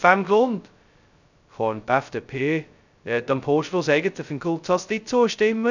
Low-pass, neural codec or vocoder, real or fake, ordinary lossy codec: 7.2 kHz; codec, 16 kHz, 0.2 kbps, FocalCodec; fake; none